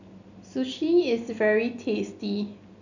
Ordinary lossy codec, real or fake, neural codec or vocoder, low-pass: none; real; none; 7.2 kHz